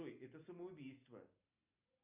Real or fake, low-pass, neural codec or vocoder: real; 3.6 kHz; none